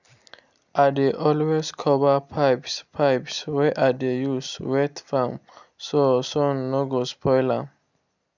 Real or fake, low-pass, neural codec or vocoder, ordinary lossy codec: real; 7.2 kHz; none; none